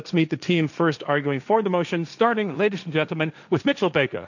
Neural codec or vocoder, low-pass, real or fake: codec, 16 kHz, 1.1 kbps, Voila-Tokenizer; 7.2 kHz; fake